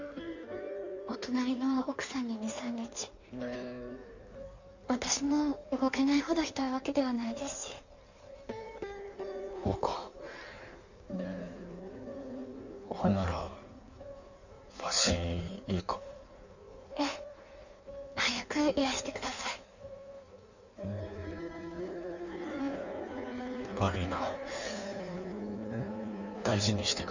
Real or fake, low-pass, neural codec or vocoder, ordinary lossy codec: fake; 7.2 kHz; codec, 16 kHz in and 24 kHz out, 1.1 kbps, FireRedTTS-2 codec; none